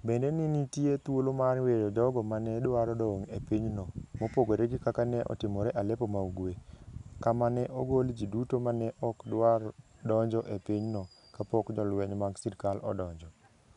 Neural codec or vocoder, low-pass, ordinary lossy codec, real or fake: none; 10.8 kHz; none; real